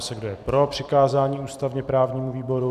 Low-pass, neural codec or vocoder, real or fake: 14.4 kHz; vocoder, 44.1 kHz, 128 mel bands every 256 samples, BigVGAN v2; fake